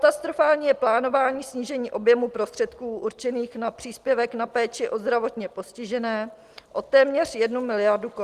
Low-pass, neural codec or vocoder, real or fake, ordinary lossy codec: 14.4 kHz; none; real; Opus, 24 kbps